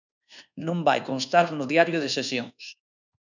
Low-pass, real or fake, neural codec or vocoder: 7.2 kHz; fake; codec, 24 kHz, 1.2 kbps, DualCodec